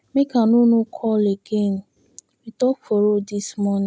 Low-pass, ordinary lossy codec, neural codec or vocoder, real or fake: none; none; none; real